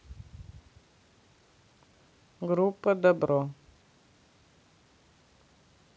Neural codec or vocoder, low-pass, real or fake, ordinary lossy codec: none; none; real; none